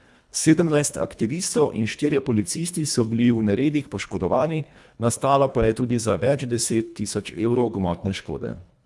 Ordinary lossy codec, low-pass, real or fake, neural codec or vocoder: none; none; fake; codec, 24 kHz, 1.5 kbps, HILCodec